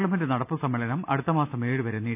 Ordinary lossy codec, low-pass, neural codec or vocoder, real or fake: none; 3.6 kHz; none; real